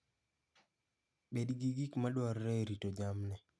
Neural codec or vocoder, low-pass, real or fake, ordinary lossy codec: none; none; real; none